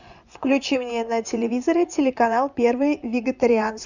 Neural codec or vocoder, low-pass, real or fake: vocoder, 24 kHz, 100 mel bands, Vocos; 7.2 kHz; fake